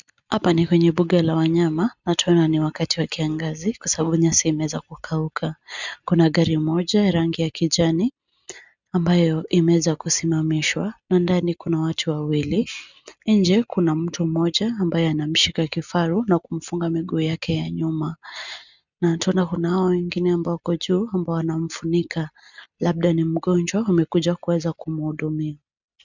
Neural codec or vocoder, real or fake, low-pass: none; real; 7.2 kHz